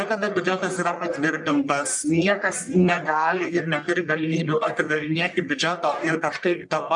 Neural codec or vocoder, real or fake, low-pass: codec, 44.1 kHz, 1.7 kbps, Pupu-Codec; fake; 10.8 kHz